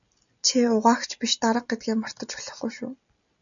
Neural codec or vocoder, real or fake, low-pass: none; real; 7.2 kHz